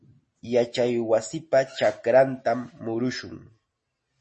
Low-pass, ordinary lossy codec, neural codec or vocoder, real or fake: 9.9 kHz; MP3, 32 kbps; none; real